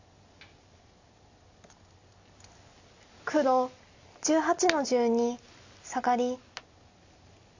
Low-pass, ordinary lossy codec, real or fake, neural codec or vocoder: 7.2 kHz; AAC, 48 kbps; real; none